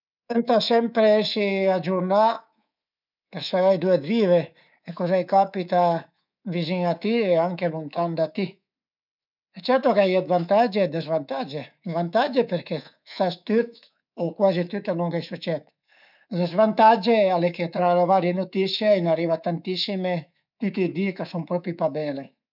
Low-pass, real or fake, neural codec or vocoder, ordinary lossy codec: 5.4 kHz; fake; codec, 24 kHz, 3.1 kbps, DualCodec; none